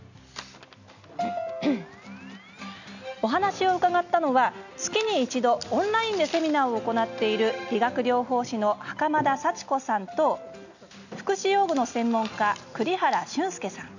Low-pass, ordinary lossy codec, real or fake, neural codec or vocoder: 7.2 kHz; none; real; none